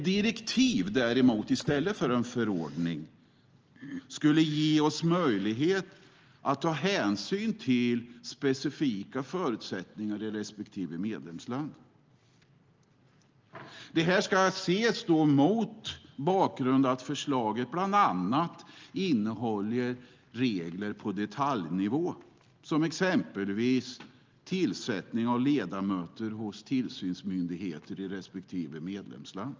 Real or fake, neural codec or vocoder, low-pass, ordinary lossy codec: real; none; 7.2 kHz; Opus, 24 kbps